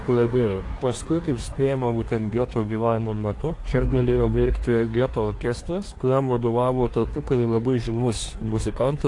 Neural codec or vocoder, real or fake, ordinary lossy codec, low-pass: codec, 24 kHz, 1 kbps, SNAC; fake; AAC, 48 kbps; 10.8 kHz